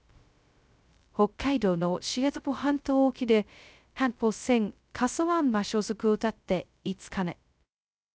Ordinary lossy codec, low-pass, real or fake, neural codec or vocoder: none; none; fake; codec, 16 kHz, 0.2 kbps, FocalCodec